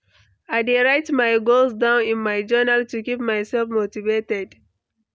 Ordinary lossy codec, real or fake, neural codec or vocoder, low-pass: none; real; none; none